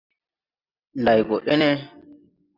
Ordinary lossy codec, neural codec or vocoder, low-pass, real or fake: Opus, 64 kbps; none; 5.4 kHz; real